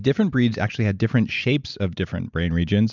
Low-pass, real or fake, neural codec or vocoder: 7.2 kHz; real; none